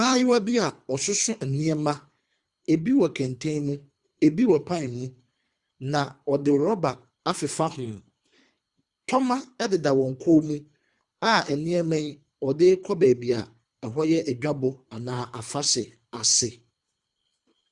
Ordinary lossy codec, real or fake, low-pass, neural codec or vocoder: Opus, 64 kbps; fake; 10.8 kHz; codec, 24 kHz, 3 kbps, HILCodec